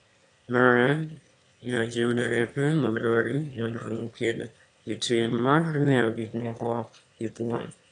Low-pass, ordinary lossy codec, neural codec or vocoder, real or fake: 9.9 kHz; AAC, 64 kbps; autoencoder, 22.05 kHz, a latent of 192 numbers a frame, VITS, trained on one speaker; fake